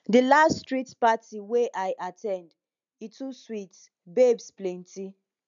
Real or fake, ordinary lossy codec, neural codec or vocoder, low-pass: real; none; none; 7.2 kHz